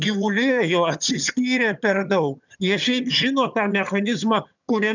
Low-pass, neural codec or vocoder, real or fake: 7.2 kHz; vocoder, 22.05 kHz, 80 mel bands, HiFi-GAN; fake